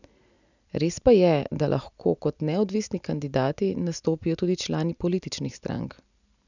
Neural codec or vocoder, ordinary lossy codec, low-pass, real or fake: none; none; 7.2 kHz; real